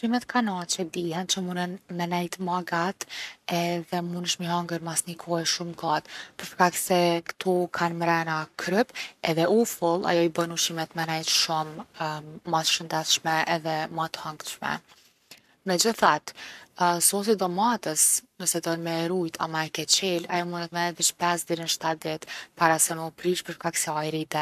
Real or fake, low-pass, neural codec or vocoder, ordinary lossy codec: fake; 14.4 kHz; codec, 44.1 kHz, 7.8 kbps, Pupu-Codec; none